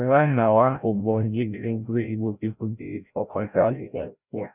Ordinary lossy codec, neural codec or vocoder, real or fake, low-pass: none; codec, 16 kHz, 0.5 kbps, FreqCodec, larger model; fake; 3.6 kHz